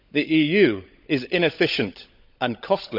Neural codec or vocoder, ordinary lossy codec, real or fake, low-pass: codec, 16 kHz, 16 kbps, FunCodec, trained on LibriTTS, 50 frames a second; none; fake; 5.4 kHz